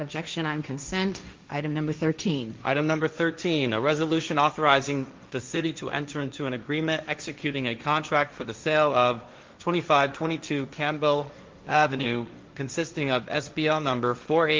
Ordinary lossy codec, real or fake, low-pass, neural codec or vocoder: Opus, 32 kbps; fake; 7.2 kHz; codec, 16 kHz, 1.1 kbps, Voila-Tokenizer